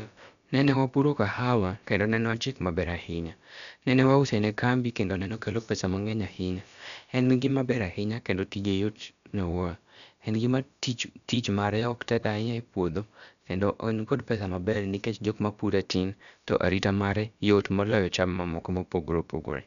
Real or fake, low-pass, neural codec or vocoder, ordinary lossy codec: fake; 7.2 kHz; codec, 16 kHz, about 1 kbps, DyCAST, with the encoder's durations; none